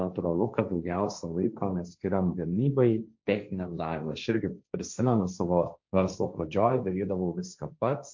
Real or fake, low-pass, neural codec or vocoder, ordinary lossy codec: fake; 7.2 kHz; codec, 16 kHz, 1.1 kbps, Voila-Tokenizer; MP3, 48 kbps